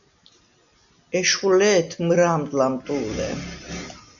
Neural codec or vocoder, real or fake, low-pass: none; real; 7.2 kHz